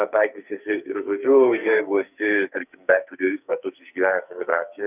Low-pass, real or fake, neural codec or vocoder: 3.6 kHz; fake; codec, 44.1 kHz, 2.6 kbps, SNAC